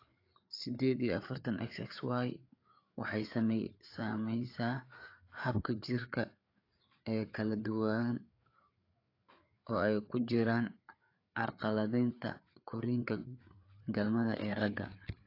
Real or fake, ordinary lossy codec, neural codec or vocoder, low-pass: fake; AAC, 32 kbps; codec, 16 kHz, 4 kbps, FreqCodec, larger model; 5.4 kHz